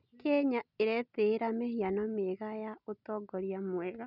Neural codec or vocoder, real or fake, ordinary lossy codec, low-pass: none; real; none; 5.4 kHz